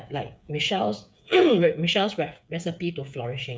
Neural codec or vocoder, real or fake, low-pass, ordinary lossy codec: codec, 16 kHz, 8 kbps, FreqCodec, smaller model; fake; none; none